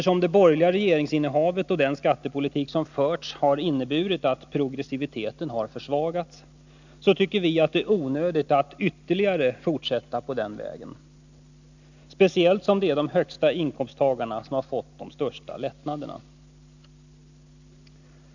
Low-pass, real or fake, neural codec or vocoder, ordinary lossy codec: 7.2 kHz; real; none; none